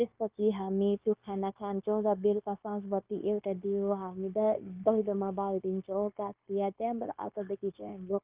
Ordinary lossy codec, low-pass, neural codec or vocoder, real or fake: Opus, 32 kbps; 3.6 kHz; codec, 16 kHz, 0.9 kbps, LongCat-Audio-Codec; fake